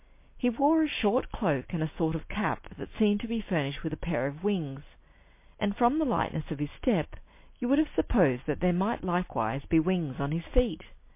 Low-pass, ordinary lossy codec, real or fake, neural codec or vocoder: 3.6 kHz; MP3, 24 kbps; real; none